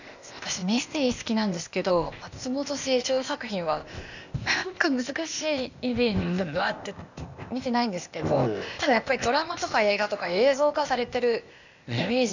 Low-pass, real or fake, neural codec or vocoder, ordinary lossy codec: 7.2 kHz; fake; codec, 16 kHz, 0.8 kbps, ZipCodec; none